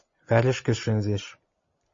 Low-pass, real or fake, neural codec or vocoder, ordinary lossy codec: 7.2 kHz; real; none; MP3, 32 kbps